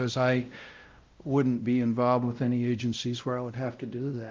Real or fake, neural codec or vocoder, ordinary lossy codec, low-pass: fake; codec, 16 kHz, 0.5 kbps, X-Codec, WavLM features, trained on Multilingual LibriSpeech; Opus, 32 kbps; 7.2 kHz